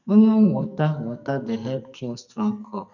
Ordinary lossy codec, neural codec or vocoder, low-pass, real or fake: none; codec, 44.1 kHz, 2.6 kbps, SNAC; 7.2 kHz; fake